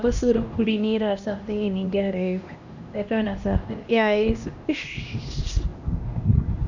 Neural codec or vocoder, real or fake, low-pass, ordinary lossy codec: codec, 16 kHz, 1 kbps, X-Codec, HuBERT features, trained on LibriSpeech; fake; 7.2 kHz; none